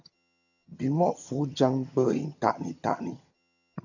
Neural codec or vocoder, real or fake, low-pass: vocoder, 22.05 kHz, 80 mel bands, HiFi-GAN; fake; 7.2 kHz